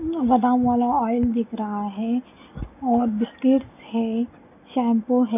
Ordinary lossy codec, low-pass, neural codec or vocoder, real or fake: none; 3.6 kHz; none; real